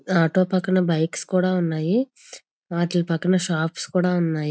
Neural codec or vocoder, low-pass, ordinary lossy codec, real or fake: none; none; none; real